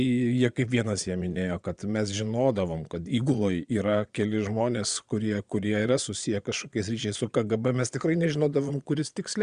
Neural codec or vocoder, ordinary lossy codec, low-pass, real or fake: vocoder, 22.05 kHz, 80 mel bands, Vocos; Opus, 64 kbps; 9.9 kHz; fake